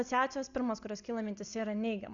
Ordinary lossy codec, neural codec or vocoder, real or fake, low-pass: Opus, 64 kbps; none; real; 7.2 kHz